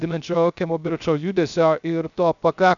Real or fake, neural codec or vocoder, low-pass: fake; codec, 16 kHz, 0.3 kbps, FocalCodec; 7.2 kHz